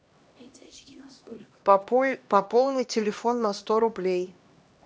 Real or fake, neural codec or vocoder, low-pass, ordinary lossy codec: fake; codec, 16 kHz, 1 kbps, X-Codec, HuBERT features, trained on LibriSpeech; none; none